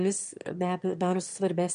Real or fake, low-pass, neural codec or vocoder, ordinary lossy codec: fake; 9.9 kHz; autoencoder, 22.05 kHz, a latent of 192 numbers a frame, VITS, trained on one speaker; MP3, 64 kbps